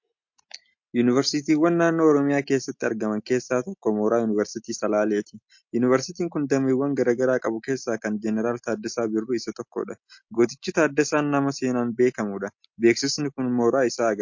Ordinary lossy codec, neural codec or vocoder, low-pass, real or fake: MP3, 48 kbps; none; 7.2 kHz; real